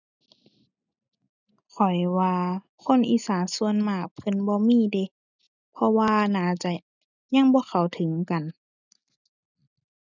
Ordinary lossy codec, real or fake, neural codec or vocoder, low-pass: none; real; none; 7.2 kHz